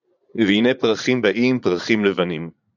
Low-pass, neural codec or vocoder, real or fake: 7.2 kHz; vocoder, 44.1 kHz, 80 mel bands, Vocos; fake